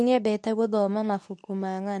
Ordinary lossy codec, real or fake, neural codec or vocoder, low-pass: none; fake; codec, 24 kHz, 0.9 kbps, WavTokenizer, medium speech release version 2; none